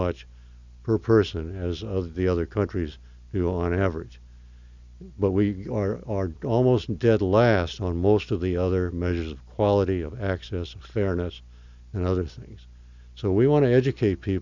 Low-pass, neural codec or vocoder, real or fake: 7.2 kHz; none; real